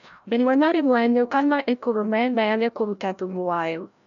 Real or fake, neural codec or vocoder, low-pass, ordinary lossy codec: fake; codec, 16 kHz, 0.5 kbps, FreqCodec, larger model; 7.2 kHz; none